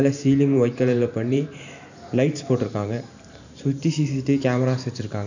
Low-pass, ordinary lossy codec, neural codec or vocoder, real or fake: 7.2 kHz; none; vocoder, 44.1 kHz, 128 mel bands every 512 samples, BigVGAN v2; fake